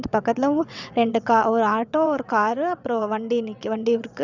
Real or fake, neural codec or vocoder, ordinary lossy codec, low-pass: fake; vocoder, 22.05 kHz, 80 mel bands, WaveNeXt; none; 7.2 kHz